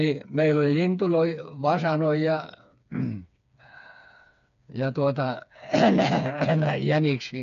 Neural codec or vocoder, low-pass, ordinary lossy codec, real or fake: codec, 16 kHz, 4 kbps, FreqCodec, smaller model; 7.2 kHz; MP3, 96 kbps; fake